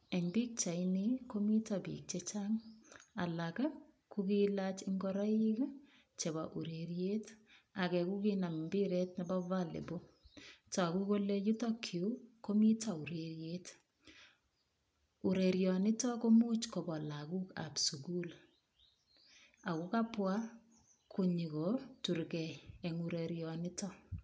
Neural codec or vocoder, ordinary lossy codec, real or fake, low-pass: none; none; real; none